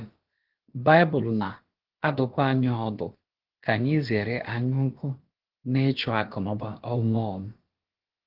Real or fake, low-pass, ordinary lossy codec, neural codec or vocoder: fake; 5.4 kHz; Opus, 16 kbps; codec, 16 kHz, about 1 kbps, DyCAST, with the encoder's durations